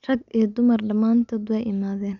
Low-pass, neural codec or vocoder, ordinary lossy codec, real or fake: 7.2 kHz; none; none; real